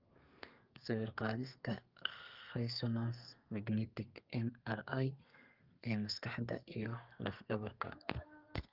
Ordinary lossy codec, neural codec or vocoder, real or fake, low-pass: Opus, 24 kbps; codec, 32 kHz, 1.9 kbps, SNAC; fake; 5.4 kHz